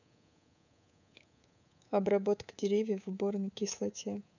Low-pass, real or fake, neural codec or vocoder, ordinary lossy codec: 7.2 kHz; fake; codec, 24 kHz, 3.1 kbps, DualCodec; none